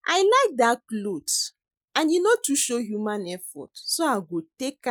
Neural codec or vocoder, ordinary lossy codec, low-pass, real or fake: none; none; none; real